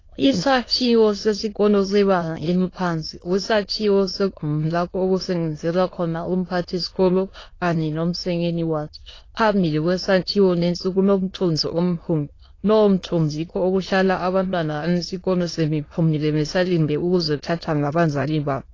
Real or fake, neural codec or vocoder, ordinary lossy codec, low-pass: fake; autoencoder, 22.05 kHz, a latent of 192 numbers a frame, VITS, trained on many speakers; AAC, 32 kbps; 7.2 kHz